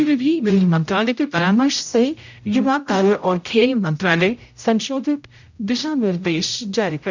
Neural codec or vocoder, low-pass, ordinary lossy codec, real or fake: codec, 16 kHz, 0.5 kbps, X-Codec, HuBERT features, trained on general audio; 7.2 kHz; none; fake